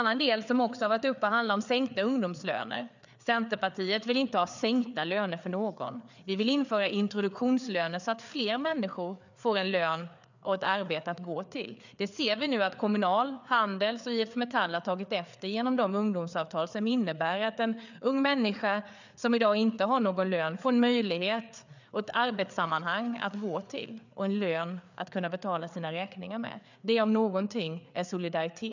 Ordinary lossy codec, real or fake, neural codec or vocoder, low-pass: none; fake; codec, 16 kHz, 4 kbps, FreqCodec, larger model; 7.2 kHz